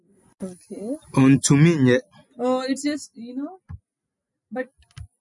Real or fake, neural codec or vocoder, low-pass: real; none; 10.8 kHz